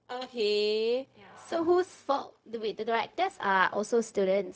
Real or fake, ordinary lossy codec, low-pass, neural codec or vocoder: fake; none; none; codec, 16 kHz, 0.4 kbps, LongCat-Audio-Codec